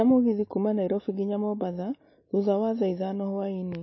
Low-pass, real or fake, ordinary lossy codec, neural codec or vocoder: 7.2 kHz; real; MP3, 24 kbps; none